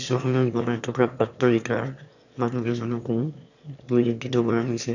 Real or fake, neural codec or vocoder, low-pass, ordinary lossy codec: fake; autoencoder, 22.05 kHz, a latent of 192 numbers a frame, VITS, trained on one speaker; 7.2 kHz; none